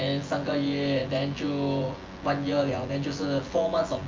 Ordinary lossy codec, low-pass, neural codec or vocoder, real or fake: Opus, 24 kbps; 7.2 kHz; vocoder, 24 kHz, 100 mel bands, Vocos; fake